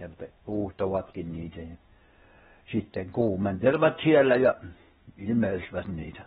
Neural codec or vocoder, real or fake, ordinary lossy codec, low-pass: codec, 16 kHz, 0.8 kbps, ZipCodec; fake; AAC, 16 kbps; 7.2 kHz